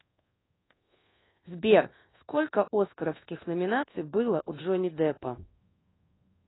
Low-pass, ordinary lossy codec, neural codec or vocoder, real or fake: 7.2 kHz; AAC, 16 kbps; codec, 24 kHz, 1.2 kbps, DualCodec; fake